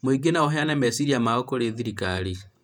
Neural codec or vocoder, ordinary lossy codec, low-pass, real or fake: vocoder, 48 kHz, 128 mel bands, Vocos; none; 19.8 kHz; fake